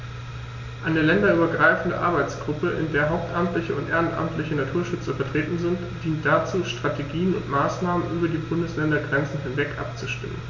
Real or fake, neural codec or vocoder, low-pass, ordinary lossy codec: real; none; 7.2 kHz; MP3, 48 kbps